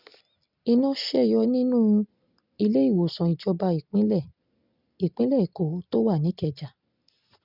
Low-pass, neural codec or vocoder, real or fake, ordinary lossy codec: 5.4 kHz; none; real; none